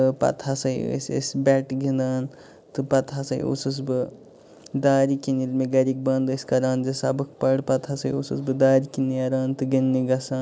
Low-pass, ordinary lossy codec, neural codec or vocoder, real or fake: none; none; none; real